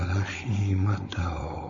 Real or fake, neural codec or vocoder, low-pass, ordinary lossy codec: fake; codec, 16 kHz, 4.8 kbps, FACodec; 7.2 kHz; MP3, 32 kbps